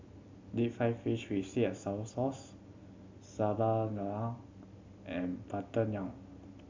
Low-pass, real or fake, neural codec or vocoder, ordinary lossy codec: 7.2 kHz; real; none; MP3, 64 kbps